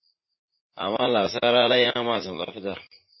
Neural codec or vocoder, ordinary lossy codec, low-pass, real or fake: vocoder, 44.1 kHz, 80 mel bands, Vocos; MP3, 24 kbps; 7.2 kHz; fake